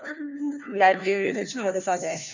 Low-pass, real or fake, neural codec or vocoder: 7.2 kHz; fake; codec, 16 kHz, 1 kbps, FunCodec, trained on LibriTTS, 50 frames a second